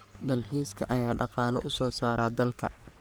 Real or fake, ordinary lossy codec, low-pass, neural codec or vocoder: fake; none; none; codec, 44.1 kHz, 3.4 kbps, Pupu-Codec